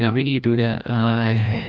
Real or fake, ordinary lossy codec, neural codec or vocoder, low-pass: fake; none; codec, 16 kHz, 1 kbps, FreqCodec, larger model; none